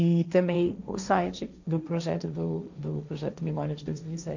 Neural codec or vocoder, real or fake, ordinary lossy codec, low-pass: codec, 16 kHz, 1.1 kbps, Voila-Tokenizer; fake; none; 7.2 kHz